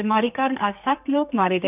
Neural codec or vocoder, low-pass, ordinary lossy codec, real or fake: codec, 32 kHz, 1.9 kbps, SNAC; 3.6 kHz; none; fake